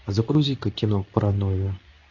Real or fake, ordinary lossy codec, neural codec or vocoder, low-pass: fake; AAC, 48 kbps; codec, 24 kHz, 0.9 kbps, WavTokenizer, medium speech release version 2; 7.2 kHz